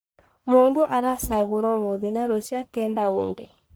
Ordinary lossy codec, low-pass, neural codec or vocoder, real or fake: none; none; codec, 44.1 kHz, 1.7 kbps, Pupu-Codec; fake